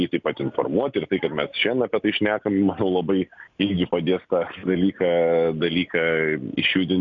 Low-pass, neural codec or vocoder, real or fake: 7.2 kHz; none; real